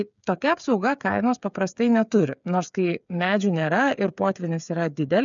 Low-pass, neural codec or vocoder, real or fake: 7.2 kHz; codec, 16 kHz, 8 kbps, FreqCodec, smaller model; fake